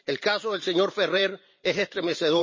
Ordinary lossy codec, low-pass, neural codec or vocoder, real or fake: MP3, 32 kbps; 7.2 kHz; vocoder, 44.1 kHz, 128 mel bands every 512 samples, BigVGAN v2; fake